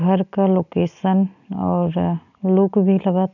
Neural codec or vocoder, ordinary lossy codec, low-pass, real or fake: none; none; 7.2 kHz; real